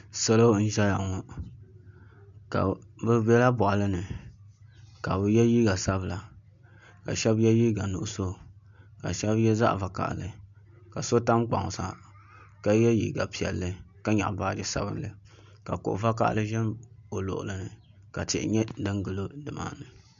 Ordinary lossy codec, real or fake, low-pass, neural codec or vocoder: MP3, 64 kbps; real; 7.2 kHz; none